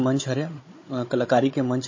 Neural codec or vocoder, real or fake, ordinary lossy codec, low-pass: codec, 16 kHz, 16 kbps, FunCodec, trained on Chinese and English, 50 frames a second; fake; MP3, 32 kbps; 7.2 kHz